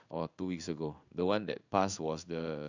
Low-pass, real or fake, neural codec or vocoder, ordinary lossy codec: 7.2 kHz; fake; codec, 16 kHz in and 24 kHz out, 1 kbps, XY-Tokenizer; none